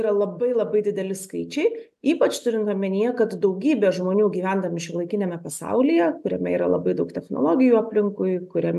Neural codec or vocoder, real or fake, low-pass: none; real; 14.4 kHz